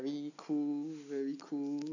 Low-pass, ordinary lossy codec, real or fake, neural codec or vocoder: 7.2 kHz; none; real; none